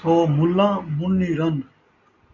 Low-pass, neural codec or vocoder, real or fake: 7.2 kHz; none; real